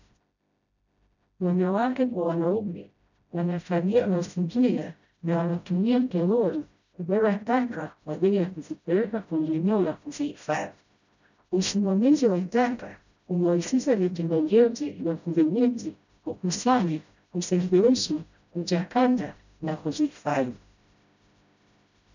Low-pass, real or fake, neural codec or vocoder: 7.2 kHz; fake; codec, 16 kHz, 0.5 kbps, FreqCodec, smaller model